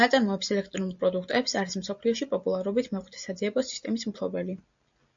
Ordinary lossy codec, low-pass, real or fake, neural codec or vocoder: AAC, 64 kbps; 7.2 kHz; real; none